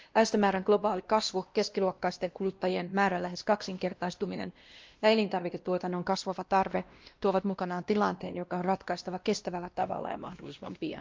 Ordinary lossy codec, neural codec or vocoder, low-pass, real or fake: Opus, 24 kbps; codec, 16 kHz, 1 kbps, X-Codec, WavLM features, trained on Multilingual LibriSpeech; 7.2 kHz; fake